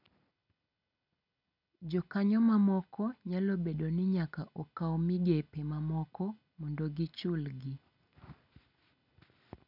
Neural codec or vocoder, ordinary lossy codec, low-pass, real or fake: none; none; 5.4 kHz; real